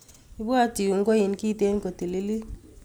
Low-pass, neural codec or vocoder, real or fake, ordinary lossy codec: none; none; real; none